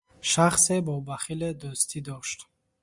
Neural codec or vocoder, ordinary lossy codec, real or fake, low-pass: none; Opus, 64 kbps; real; 10.8 kHz